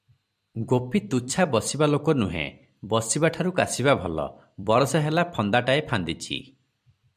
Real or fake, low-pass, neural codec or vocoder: fake; 14.4 kHz; vocoder, 44.1 kHz, 128 mel bands every 256 samples, BigVGAN v2